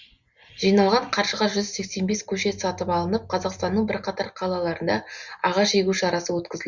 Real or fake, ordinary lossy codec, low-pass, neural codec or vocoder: real; Opus, 64 kbps; 7.2 kHz; none